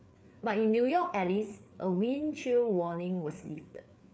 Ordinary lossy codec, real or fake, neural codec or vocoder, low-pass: none; fake; codec, 16 kHz, 4 kbps, FreqCodec, larger model; none